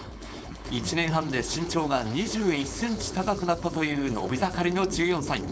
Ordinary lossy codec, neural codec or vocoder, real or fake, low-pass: none; codec, 16 kHz, 4.8 kbps, FACodec; fake; none